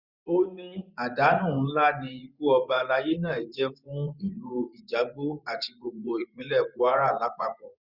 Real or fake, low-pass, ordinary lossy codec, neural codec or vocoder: fake; 5.4 kHz; Opus, 64 kbps; vocoder, 24 kHz, 100 mel bands, Vocos